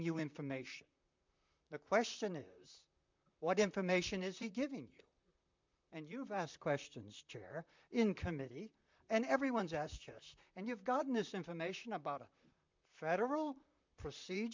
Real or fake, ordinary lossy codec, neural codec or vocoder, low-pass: fake; MP3, 64 kbps; vocoder, 44.1 kHz, 128 mel bands, Pupu-Vocoder; 7.2 kHz